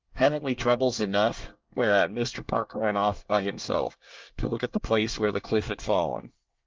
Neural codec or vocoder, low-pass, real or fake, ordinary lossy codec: codec, 24 kHz, 1 kbps, SNAC; 7.2 kHz; fake; Opus, 24 kbps